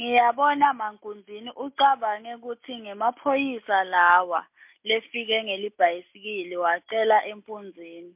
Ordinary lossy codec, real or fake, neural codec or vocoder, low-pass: MP3, 32 kbps; real; none; 3.6 kHz